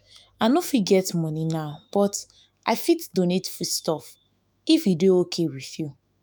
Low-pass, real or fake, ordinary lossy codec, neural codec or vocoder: none; fake; none; autoencoder, 48 kHz, 128 numbers a frame, DAC-VAE, trained on Japanese speech